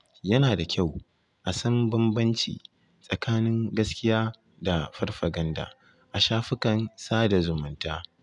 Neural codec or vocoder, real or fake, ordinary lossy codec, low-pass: none; real; none; 10.8 kHz